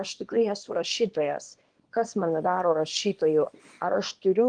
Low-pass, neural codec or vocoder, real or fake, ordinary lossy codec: 9.9 kHz; codec, 24 kHz, 0.9 kbps, WavTokenizer, small release; fake; Opus, 32 kbps